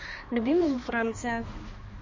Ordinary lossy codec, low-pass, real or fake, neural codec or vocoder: MP3, 32 kbps; 7.2 kHz; fake; codec, 16 kHz, 2 kbps, X-Codec, HuBERT features, trained on balanced general audio